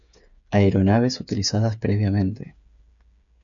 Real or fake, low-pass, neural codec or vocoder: fake; 7.2 kHz; codec, 16 kHz, 8 kbps, FreqCodec, smaller model